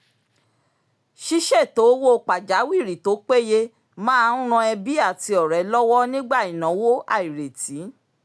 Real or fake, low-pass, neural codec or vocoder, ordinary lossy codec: real; none; none; none